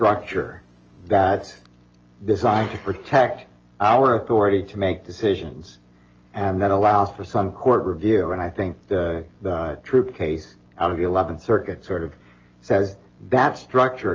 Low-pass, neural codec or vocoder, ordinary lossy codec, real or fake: 7.2 kHz; none; Opus, 24 kbps; real